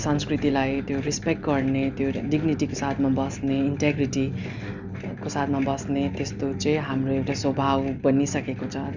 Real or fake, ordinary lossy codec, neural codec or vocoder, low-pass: real; none; none; 7.2 kHz